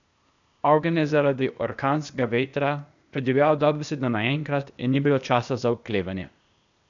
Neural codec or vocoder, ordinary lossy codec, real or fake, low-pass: codec, 16 kHz, 0.8 kbps, ZipCodec; none; fake; 7.2 kHz